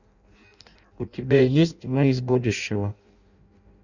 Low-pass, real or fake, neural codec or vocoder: 7.2 kHz; fake; codec, 16 kHz in and 24 kHz out, 0.6 kbps, FireRedTTS-2 codec